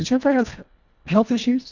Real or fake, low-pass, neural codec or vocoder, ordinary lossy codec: fake; 7.2 kHz; codec, 24 kHz, 1.5 kbps, HILCodec; MP3, 64 kbps